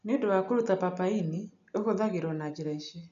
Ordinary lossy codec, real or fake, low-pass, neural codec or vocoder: none; real; 7.2 kHz; none